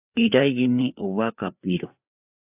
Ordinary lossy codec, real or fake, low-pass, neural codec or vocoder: AAC, 32 kbps; fake; 3.6 kHz; codec, 16 kHz, 4 kbps, FreqCodec, larger model